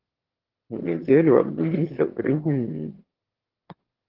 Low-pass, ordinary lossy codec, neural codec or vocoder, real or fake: 5.4 kHz; Opus, 16 kbps; autoencoder, 22.05 kHz, a latent of 192 numbers a frame, VITS, trained on one speaker; fake